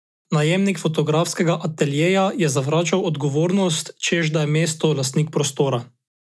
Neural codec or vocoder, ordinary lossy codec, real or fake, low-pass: none; none; real; none